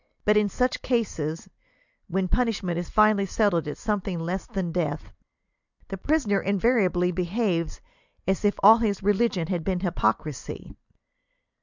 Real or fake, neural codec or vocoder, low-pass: real; none; 7.2 kHz